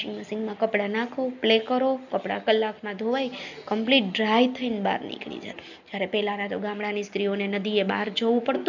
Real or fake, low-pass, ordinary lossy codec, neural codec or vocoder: real; 7.2 kHz; none; none